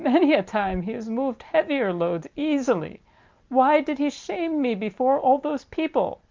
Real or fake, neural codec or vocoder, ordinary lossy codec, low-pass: real; none; Opus, 24 kbps; 7.2 kHz